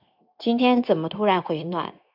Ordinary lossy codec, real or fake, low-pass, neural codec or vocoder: MP3, 48 kbps; fake; 5.4 kHz; codec, 16 kHz, 0.9 kbps, LongCat-Audio-Codec